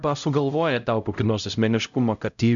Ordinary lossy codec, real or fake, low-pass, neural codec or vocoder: MP3, 96 kbps; fake; 7.2 kHz; codec, 16 kHz, 0.5 kbps, X-Codec, HuBERT features, trained on LibriSpeech